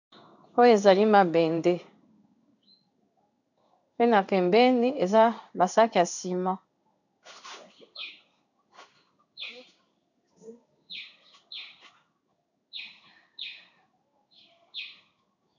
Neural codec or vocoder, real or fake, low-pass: codec, 16 kHz in and 24 kHz out, 1 kbps, XY-Tokenizer; fake; 7.2 kHz